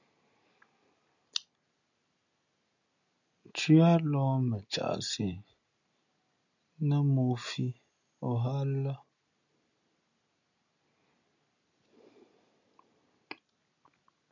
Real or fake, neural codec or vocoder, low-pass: real; none; 7.2 kHz